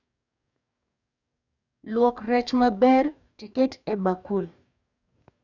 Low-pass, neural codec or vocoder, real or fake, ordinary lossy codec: 7.2 kHz; codec, 44.1 kHz, 2.6 kbps, DAC; fake; none